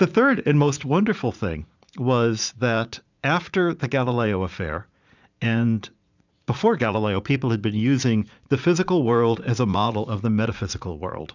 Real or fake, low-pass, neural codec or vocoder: fake; 7.2 kHz; vocoder, 44.1 kHz, 80 mel bands, Vocos